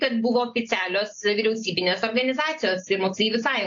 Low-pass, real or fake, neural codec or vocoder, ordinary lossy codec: 7.2 kHz; real; none; AAC, 48 kbps